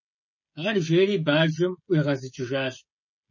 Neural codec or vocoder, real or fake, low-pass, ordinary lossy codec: codec, 16 kHz, 16 kbps, FreqCodec, smaller model; fake; 7.2 kHz; MP3, 32 kbps